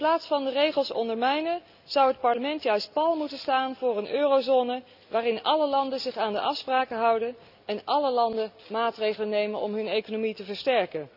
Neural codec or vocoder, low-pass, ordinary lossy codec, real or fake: none; 5.4 kHz; none; real